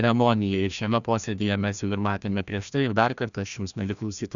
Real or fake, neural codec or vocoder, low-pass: fake; codec, 16 kHz, 1 kbps, FreqCodec, larger model; 7.2 kHz